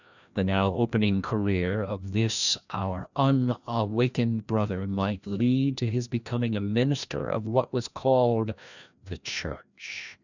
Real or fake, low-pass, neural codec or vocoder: fake; 7.2 kHz; codec, 16 kHz, 1 kbps, FreqCodec, larger model